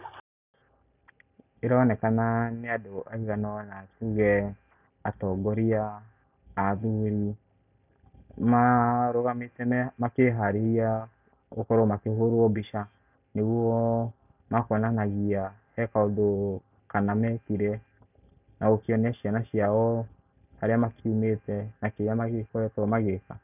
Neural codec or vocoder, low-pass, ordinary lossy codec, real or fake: none; 3.6 kHz; none; real